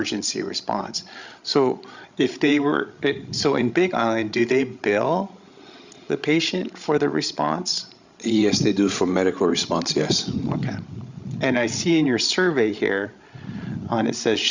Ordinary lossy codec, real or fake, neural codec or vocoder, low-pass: Opus, 64 kbps; fake; codec, 16 kHz, 16 kbps, FreqCodec, larger model; 7.2 kHz